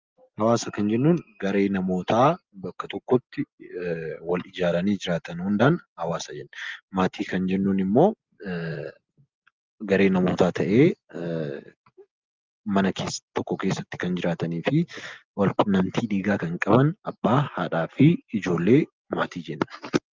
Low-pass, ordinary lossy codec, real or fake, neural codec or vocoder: 7.2 kHz; Opus, 24 kbps; real; none